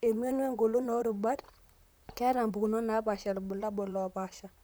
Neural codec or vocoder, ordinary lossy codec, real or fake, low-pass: vocoder, 44.1 kHz, 128 mel bands, Pupu-Vocoder; none; fake; none